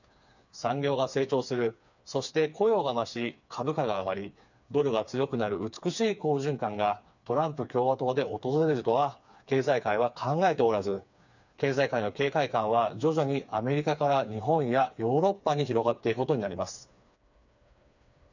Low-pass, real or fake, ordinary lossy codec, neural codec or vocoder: 7.2 kHz; fake; none; codec, 16 kHz, 4 kbps, FreqCodec, smaller model